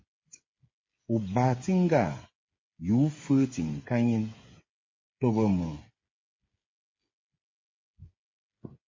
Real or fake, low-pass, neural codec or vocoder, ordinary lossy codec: fake; 7.2 kHz; codec, 16 kHz, 8 kbps, FreqCodec, smaller model; MP3, 32 kbps